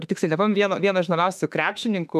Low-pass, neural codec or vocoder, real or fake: 14.4 kHz; autoencoder, 48 kHz, 32 numbers a frame, DAC-VAE, trained on Japanese speech; fake